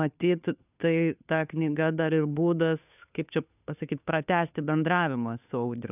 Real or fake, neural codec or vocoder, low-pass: fake; codec, 16 kHz, 2 kbps, FunCodec, trained on Chinese and English, 25 frames a second; 3.6 kHz